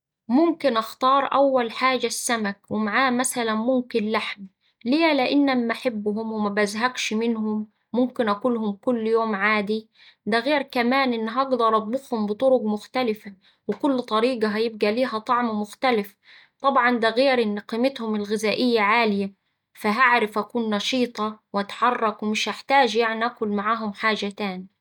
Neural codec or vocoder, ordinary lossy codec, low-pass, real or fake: none; none; 19.8 kHz; real